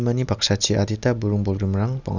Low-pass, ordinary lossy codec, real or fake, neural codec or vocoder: 7.2 kHz; none; real; none